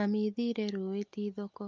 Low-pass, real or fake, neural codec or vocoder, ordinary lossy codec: 7.2 kHz; real; none; Opus, 24 kbps